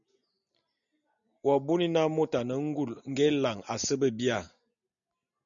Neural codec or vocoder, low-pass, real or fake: none; 7.2 kHz; real